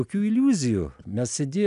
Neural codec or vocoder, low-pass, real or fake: none; 10.8 kHz; real